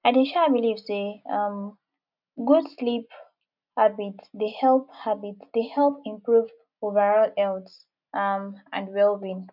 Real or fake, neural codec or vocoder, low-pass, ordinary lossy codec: real; none; 5.4 kHz; none